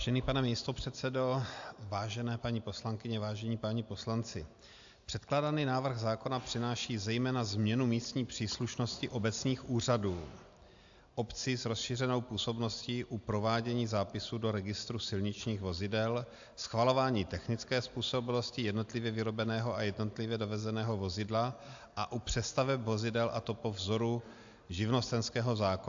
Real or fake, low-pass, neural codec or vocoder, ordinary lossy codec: real; 7.2 kHz; none; AAC, 64 kbps